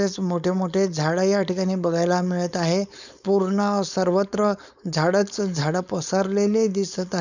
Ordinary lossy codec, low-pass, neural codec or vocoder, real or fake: none; 7.2 kHz; codec, 16 kHz, 4.8 kbps, FACodec; fake